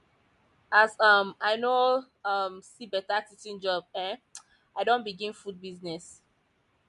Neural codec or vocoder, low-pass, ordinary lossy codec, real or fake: none; 10.8 kHz; MP3, 64 kbps; real